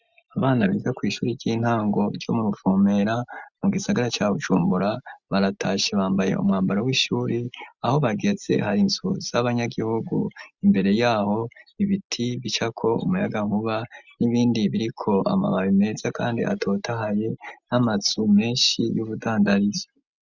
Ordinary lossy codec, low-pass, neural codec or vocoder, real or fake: Opus, 64 kbps; 7.2 kHz; none; real